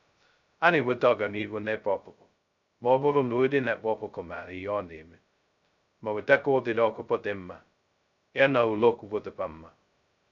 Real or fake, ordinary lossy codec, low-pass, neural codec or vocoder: fake; none; 7.2 kHz; codec, 16 kHz, 0.2 kbps, FocalCodec